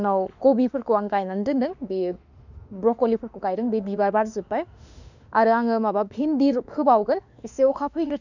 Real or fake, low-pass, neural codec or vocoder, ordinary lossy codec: fake; 7.2 kHz; autoencoder, 48 kHz, 32 numbers a frame, DAC-VAE, trained on Japanese speech; none